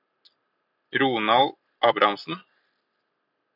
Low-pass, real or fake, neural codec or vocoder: 5.4 kHz; real; none